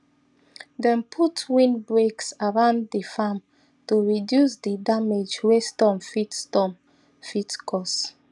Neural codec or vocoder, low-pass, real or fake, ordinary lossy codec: none; 10.8 kHz; real; none